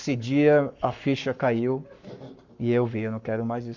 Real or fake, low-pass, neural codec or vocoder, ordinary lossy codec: fake; 7.2 kHz; codec, 44.1 kHz, 7.8 kbps, Pupu-Codec; MP3, 64 kbps